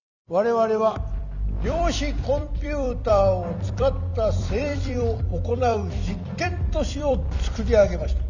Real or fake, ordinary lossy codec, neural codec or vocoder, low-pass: fake; none; vocoder, 44.1 kHz, 128 mel bands every 256 samples, BigVGAN v2; 7.2 kHz